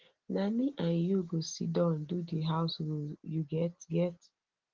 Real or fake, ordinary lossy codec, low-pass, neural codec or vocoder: real; Opus, 16 kbps; 7.2 kHz; none